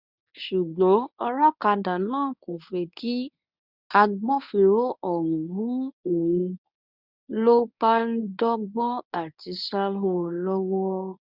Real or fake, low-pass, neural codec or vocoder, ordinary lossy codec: fake; 5.4 kHz; codec, 24 kHz, 0.9 kbps, WavTokenizer, medium speech release version 1; none